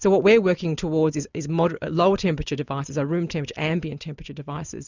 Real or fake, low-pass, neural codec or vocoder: real; 7.2 kHz; none